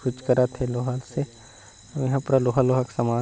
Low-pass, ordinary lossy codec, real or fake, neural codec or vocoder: none; none; real; none